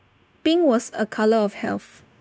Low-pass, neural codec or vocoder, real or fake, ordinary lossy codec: none; codec, 16 kHz, 0.9 kbps, LongCat-Audio-Codec; fake; none